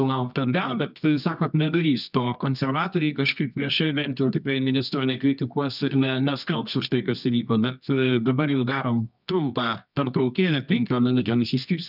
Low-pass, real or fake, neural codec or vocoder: 5.4 kHz; fake; codec, 24 kHz, 0.9 kbps, WavTokenizer, medium music audio release